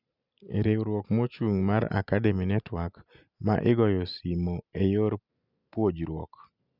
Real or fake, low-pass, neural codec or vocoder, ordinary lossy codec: real; 5.4 kHz; none; none